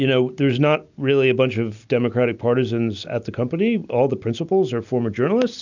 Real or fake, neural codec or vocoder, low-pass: real; none; 7.2 kHz